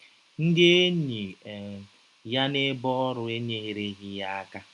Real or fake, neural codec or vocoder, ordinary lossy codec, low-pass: real; none; none; 10.8 kHz